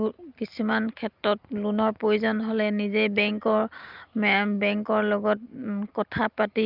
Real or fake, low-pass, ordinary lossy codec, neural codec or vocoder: real; 5.4 kHz; Opus, 24 kbps; none